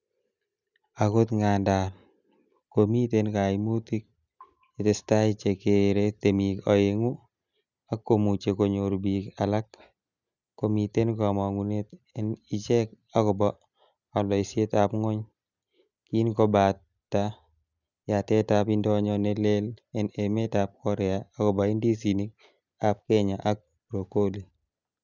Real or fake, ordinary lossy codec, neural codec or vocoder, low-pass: real; none; none; 7.2 kHz